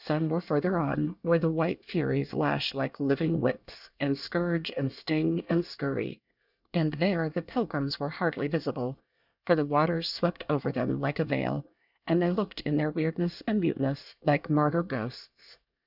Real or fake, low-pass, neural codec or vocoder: fake; 5.4 kHz; codec, 24 kHz, 1 kbps, SNAC